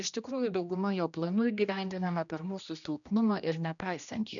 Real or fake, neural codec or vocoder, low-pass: fake; codec, 16 kHz, 1 kbps, X-Codec, HuBERT features, trained on general audio; 7.2 kHz